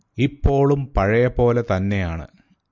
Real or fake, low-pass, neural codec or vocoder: real; 7.2 kHz; none